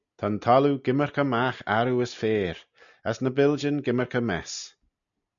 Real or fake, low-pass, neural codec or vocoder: real; 7.2 kHz; none